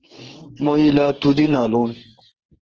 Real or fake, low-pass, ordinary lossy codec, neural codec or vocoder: fake; 7.2 kHz; Opus, 16 kbps; codec, 44.1 kHz, 2.6 kbps, SNAC